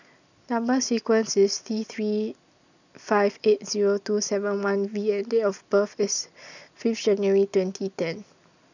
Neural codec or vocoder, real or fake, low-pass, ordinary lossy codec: none; real; 7.2 kHz; none